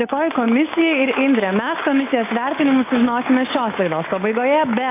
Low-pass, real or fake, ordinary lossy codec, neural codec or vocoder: 3.6 kHz; fake; AAC, 24 kbps; codec, 16 kHz, 6 kbps, DAC